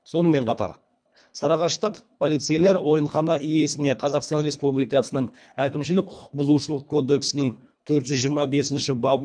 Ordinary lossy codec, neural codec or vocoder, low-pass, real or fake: none; codec, 24 kHz, 1.5 kbps, HILCodec; 9.9 kHz; fake